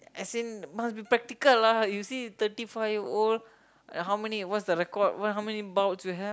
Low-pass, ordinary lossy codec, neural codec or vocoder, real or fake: none; none; none; real